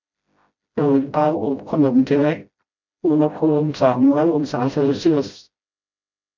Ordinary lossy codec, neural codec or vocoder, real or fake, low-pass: MP3, 64 kbps; codec, 16 kHz, 0.5 kbps, FreqCodec, smaller model; fake; 7.2 kHz